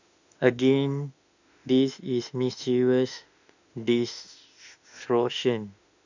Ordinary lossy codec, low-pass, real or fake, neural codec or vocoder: none; 7.2 kHz; fake; autoencoder, 48 kHz, 32 numbers a frame, DAC-VAE, trained on Japanese speech